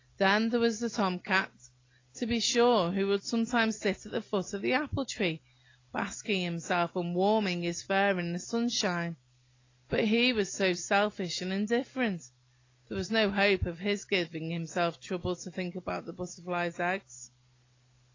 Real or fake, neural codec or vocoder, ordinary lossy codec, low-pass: real; none; AAC, 32 kbps; 7.2 kHz